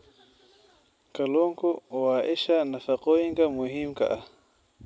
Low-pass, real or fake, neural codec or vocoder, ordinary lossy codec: none; real; none; none